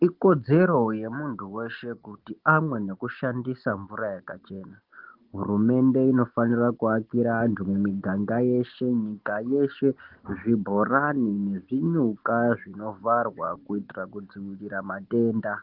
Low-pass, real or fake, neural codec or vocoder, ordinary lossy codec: 5.4 kHz; real; none; Opus, 16 kbps